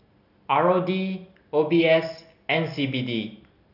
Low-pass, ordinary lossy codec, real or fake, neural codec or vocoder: 5.4 kHz; none; real; none